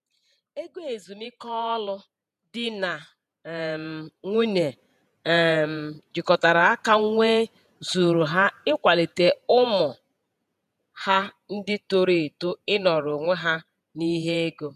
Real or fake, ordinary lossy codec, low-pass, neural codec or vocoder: fake; none; 14.4 kHz; vocoder, 48 kHz, 128 mel bands, Vocos